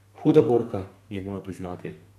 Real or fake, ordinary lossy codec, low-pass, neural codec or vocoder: fake; none; 14.4 kHz; codec, 32 kHz, 1.9 kbps, SNAC